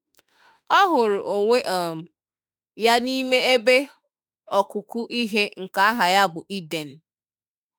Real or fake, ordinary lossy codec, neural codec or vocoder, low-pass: fake; none; autoencoder, 48 kHz, 32 numbers a frame, DAC-VAE, trained on Japanese speech; none